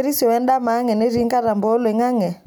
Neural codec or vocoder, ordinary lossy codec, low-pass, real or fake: none; none; none; real